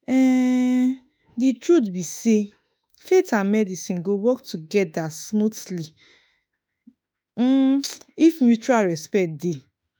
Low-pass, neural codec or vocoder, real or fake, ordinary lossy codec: none; autoencoder, 48 kHz, 32 numbers a frame, DAC-VAE, trained on Japanese speech; fake; none